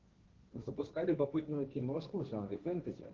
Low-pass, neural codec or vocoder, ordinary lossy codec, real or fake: 7.2 kHz; codec, 16 kHz, 1.1 kbps, Voila-Tokenizer; Opus, 16 kbps; fake